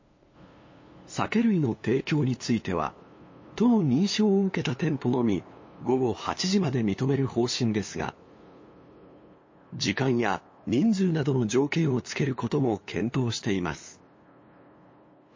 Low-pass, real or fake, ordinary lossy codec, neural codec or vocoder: 7.2 kHz; fake; MP3, 32 kbps; codec, 16 kHz, 2 kbps, FunCodec, trained on LibriTTS, 25 frames a second